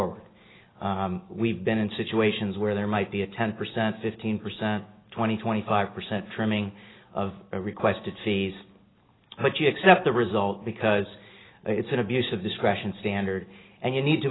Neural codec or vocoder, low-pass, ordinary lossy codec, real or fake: none; 7.2 kHz; AAC, 16 kbps; real